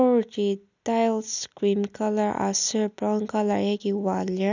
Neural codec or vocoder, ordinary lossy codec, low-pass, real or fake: none; none; 7.2 kHz; real